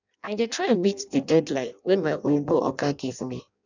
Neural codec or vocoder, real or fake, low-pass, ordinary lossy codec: codec, 16 kHz in and 24 kHz out, 0.6 kbps, FireRedTTS-2 codec; fake; 7.2 kHz; none